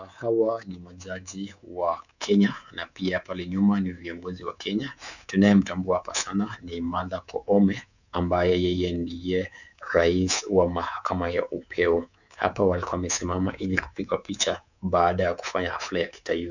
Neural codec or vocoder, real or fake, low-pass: codec, 24 kHz, 3.1 kbps, DualCodec; fake; 7.2 kHz